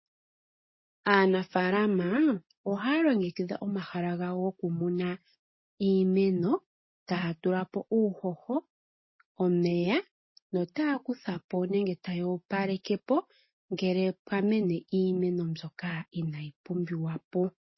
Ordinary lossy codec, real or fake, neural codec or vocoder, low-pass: MP3, 24 kbps; real; none; 7.2 kHz